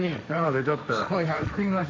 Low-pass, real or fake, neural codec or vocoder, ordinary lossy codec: none; fake; codec, 16 kHz, 1.1 kbps, Voila-Tokenizer; none